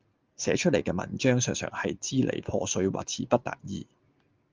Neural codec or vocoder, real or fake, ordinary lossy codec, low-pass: none; real; Opus, 32 kbps; 7.2 kHz